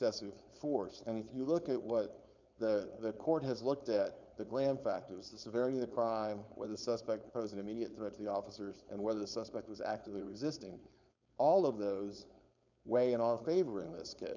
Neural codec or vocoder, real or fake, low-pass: codec, 16 kHz, 4.8 kbps, FACodec; fake; 7.2 kHz